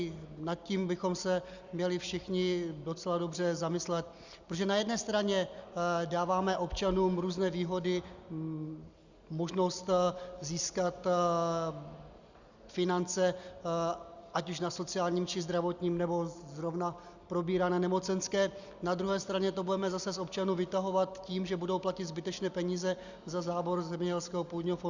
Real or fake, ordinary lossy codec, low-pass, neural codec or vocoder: real; Opus, 64 kbps; 7.2 kHz; none